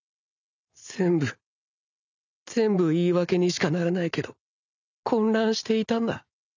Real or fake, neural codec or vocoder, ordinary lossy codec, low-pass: real; none; none; 7.2 kHz